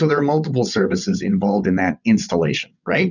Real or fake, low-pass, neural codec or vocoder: fake; 7.2 kHz; vocoder, 22.05 kHz, 80 mel bands, WaveNeXt